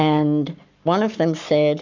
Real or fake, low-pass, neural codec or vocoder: fake; 7.2 kHz; codec, 44.1 kHz, 7.8 kbps, Pupu-Codec